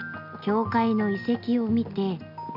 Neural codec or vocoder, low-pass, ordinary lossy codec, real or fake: none; 5.4 kHz; none; real